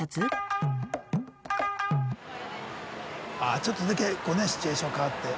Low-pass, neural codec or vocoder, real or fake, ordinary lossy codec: none; none; real; none